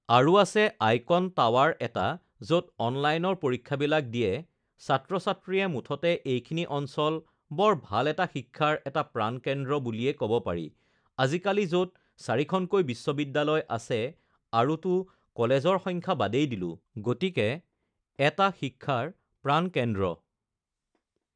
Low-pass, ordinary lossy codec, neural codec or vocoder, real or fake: 9.9 kHz; none; none; real